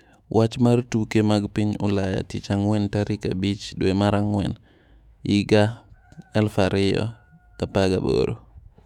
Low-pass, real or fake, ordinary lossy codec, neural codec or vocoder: 19.8 kHz; fake; none; autoencoder, 48 kHz, 128 numbers a frame, DAC-VAE, trained on Japanese speech